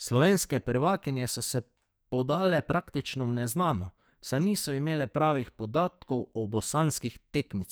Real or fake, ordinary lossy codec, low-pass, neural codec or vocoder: fake; none; none; codec, 44.1 kHz, 2.6 kbps, SNAC